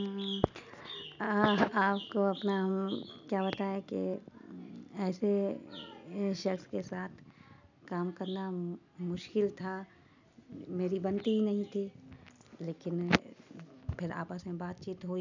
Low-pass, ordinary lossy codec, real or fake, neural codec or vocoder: 7.2 kHz; none; real; none